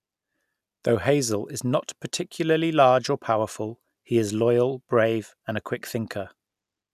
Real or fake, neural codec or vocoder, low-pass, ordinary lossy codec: real; none; 14.4 kHz; none